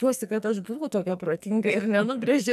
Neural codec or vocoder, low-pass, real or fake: codec, 44.1 kHz, 2.6 kbps, SNAC; 14.4 kHz; fake